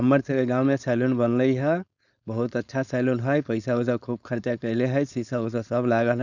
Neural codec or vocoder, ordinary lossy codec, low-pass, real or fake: codec, 16 kHz, 4.8 kbps, FACodec; none; 7.2 kHz; fake